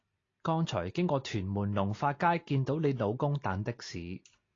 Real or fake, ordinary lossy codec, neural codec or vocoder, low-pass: real; AAC, 32 kbps; none; 7.2 kHz